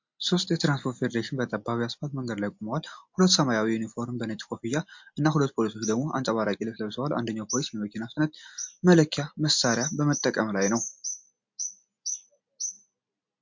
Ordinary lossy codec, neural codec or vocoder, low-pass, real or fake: MP3, 48 kbps; none; 7.2 kHz; real